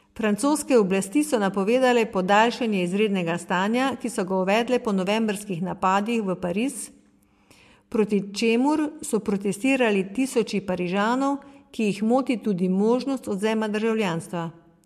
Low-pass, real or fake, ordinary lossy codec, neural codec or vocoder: 14.4 kHz; real; MP3, 64 kbps; none